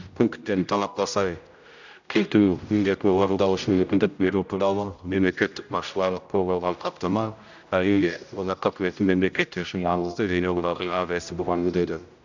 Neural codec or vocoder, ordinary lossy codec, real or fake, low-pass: codec, 16 kHz, 0.5 kbps, X-Codec, HuBERT features, trained on general audio; none; fake; 7.2 kHz